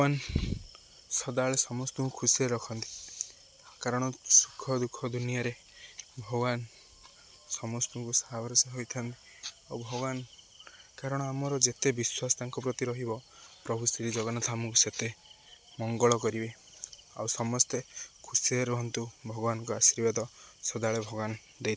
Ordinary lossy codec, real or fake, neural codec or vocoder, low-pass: none; real; none; none